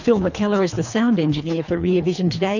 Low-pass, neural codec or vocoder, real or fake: 7.2 kHz; codec, 24 kHz, 3 kbps, HILCodec; fake